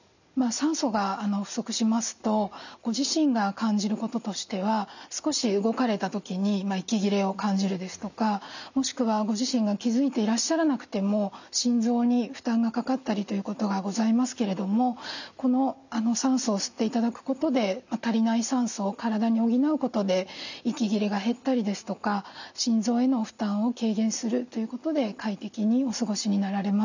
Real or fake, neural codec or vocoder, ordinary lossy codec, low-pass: real; none; none; 7.2 kHz